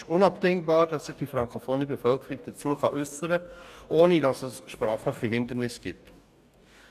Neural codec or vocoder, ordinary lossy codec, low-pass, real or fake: codec, 44.1 kHz, 2.6 kbps, DAC; none; 14.4 kHz; fake